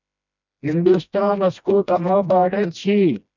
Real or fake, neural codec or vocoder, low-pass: fake; codec, 16 kHz, 1 kbps, FreqCodec, smaller model; 7.2 kHz